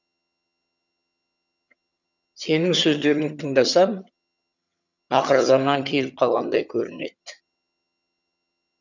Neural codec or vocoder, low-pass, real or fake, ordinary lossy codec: vocoder, 22.05 kHz, 80 mel bands, HiFi-GAN; 7.2 kHz; fake; none